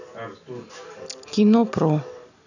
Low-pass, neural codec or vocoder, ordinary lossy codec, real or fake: 7.2 kHz; none; none; real